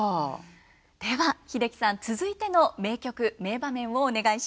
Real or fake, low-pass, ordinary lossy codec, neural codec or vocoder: real; none; none; none